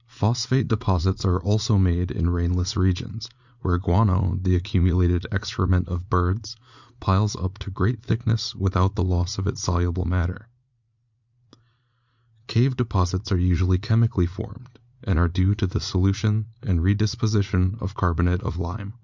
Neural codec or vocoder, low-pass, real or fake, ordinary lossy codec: vocoder, 44.1 kHz, 80 mel bands, Vocos; 7.2 kHz; fake; Opus, 64 kbps